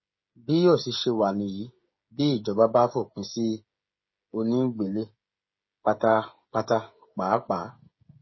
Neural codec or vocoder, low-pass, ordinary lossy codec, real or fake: codec, 16 kHz, 16 kbps, FreqCodec, smaller model; 7.2 kHz; MP3, 24 kbps; fake